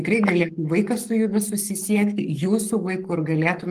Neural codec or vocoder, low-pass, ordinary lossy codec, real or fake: codec, 44.1 kHz, 7.8 kbps, Pupu-Codec; 14.4 kHz; Opus, 24 kbps; fake